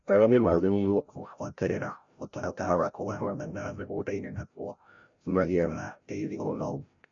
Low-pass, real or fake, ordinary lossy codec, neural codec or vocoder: 7.2 kHz; fake; MP3, 64 kbps; codec, 16 kHz, 0.5 kbps, FreqCodec, larger model